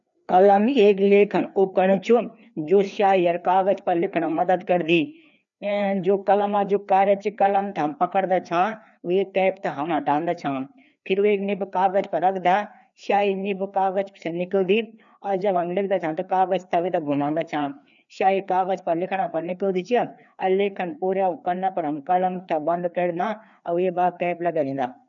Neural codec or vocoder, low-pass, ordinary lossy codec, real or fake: codec, 16 kHz, 2 kbps, FreqCodec, larger model; 7.2 kHz; none; fake